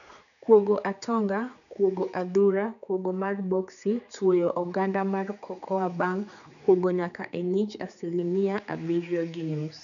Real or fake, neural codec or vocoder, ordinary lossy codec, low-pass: fake; codec, 16 kHz, 4 kbps, X-Codec, HuBERT features, trained on general audio; none; 7.2 kHz